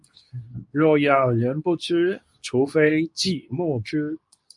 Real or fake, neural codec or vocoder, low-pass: fake; codec, 24 kHz, 0.9 kbps, WavTokenizer, medium speech release version 2; 10.8 kHz